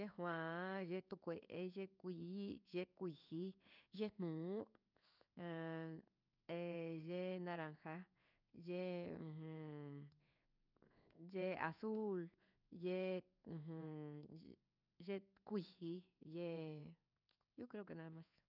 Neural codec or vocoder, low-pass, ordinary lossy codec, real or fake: codec, 16 kHz in and 24 kHz out, 1 kbps, XY-Tokenizer; 5.4 kHz; none; fake